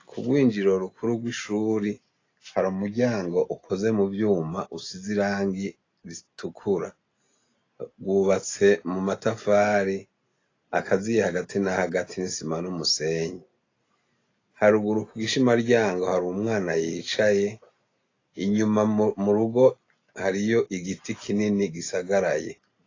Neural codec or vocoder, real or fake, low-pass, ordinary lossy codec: none; real; 7.2 kHz; AAC, 32 kbps